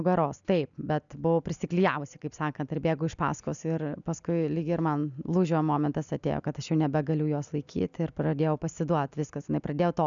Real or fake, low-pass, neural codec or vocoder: real; 7.2 kHz; none